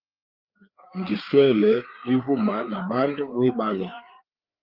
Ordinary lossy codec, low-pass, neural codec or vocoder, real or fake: Opus, 32 kbps; 5.4 kHz; codec, 16 kHz, 4 kbps, FreqCodec, larger model; fake